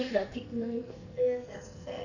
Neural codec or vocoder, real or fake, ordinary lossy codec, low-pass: codec, 44.1 kHz, 2.6 kbps, SNAC; fake; none; 7.2 kHz